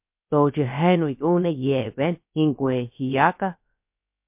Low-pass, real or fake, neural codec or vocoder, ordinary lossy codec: 3.6 kHz; fake; codec, 16 kHz, about 1 kbps, DyCAST, with the encoder's durations; MP3, 32 kbps